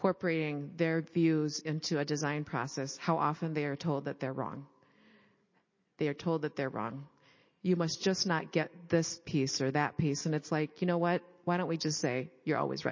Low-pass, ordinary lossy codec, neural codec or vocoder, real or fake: 7.2 kHz; MP3, 32 kbps; none; real